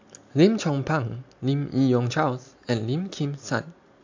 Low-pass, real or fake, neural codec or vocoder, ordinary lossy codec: 7.2 kHz; real; none; AAC, 48 kbps